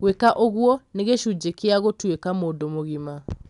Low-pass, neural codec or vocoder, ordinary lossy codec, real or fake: 10.8 kHz; none; none; real